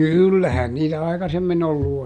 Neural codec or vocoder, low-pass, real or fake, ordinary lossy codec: none; none; real; none